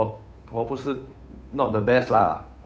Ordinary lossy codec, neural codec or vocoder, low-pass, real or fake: none; codec, 16 kHz, 2 kbps, FunCodec, trained on Chinese and English, 25 frames a second; none; fake